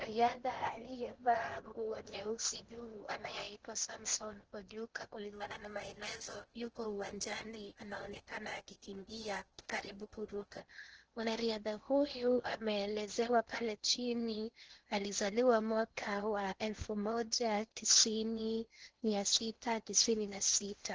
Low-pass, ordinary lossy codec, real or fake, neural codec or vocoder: 7.2 kHz; Opus, 16 kbps; fake; codec, 16 kHz in and 24 kHz out, 0.8 kbps, FocalCodec, streaming, 65536 codes